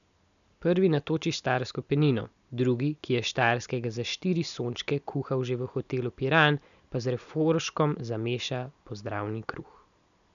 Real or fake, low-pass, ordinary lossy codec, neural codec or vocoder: real; 7.2 kHz; none; none